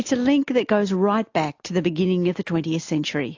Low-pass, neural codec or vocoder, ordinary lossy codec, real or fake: 7.2 kHz; none; AAC, 48 kbps; real